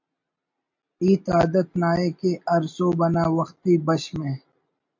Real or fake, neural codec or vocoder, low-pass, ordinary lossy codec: real; none; 7.2 kHz; MP3, 64 kbps